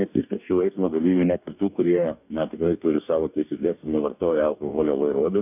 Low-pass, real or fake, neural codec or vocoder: 3.6 kHz; fake; codec, 44.1 kHz, 2.6 kbps, DAC